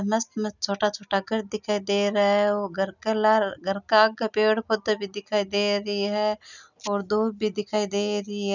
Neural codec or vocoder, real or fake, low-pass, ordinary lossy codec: none; real; 7.2 kHz; none